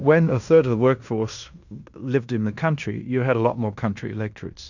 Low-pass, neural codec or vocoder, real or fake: 7.2 kHz; codec, 16 kHz in and 24 kHz out, 0.9 kbps, LongCat-Audio-Codec, fine tuned four codebook decoder; fake